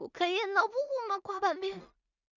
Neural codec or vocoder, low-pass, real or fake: codec, 16 kHz in and 24 kHz out, 0.4 kbps, LongCat-Audio-Codec, two codebook decoder; 7.2 kHz; fake